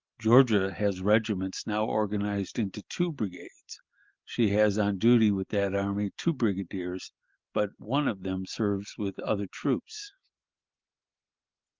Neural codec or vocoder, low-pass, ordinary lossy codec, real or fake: none; 7.2 kHz; Opus, 32 kbps; real